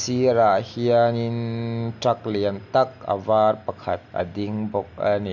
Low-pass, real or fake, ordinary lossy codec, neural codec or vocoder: 7.2 kHz; real; none; none